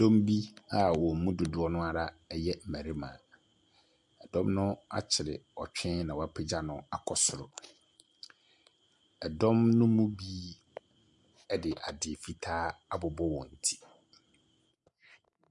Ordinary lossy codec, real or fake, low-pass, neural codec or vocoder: MP3, 96 kbps; real; 10.8 kHz; none